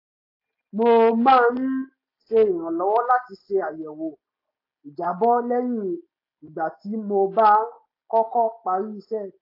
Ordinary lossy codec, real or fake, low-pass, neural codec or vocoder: AAC, 32 kbps; real; 5.4 kHz; none